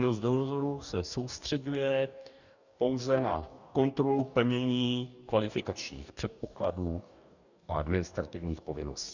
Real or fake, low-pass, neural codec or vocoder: fake; 7.2 kHz; codec, 44.1 kHz, 2.6 kbps, DAC